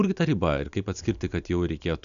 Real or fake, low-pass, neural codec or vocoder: real; 7.2 kHz; none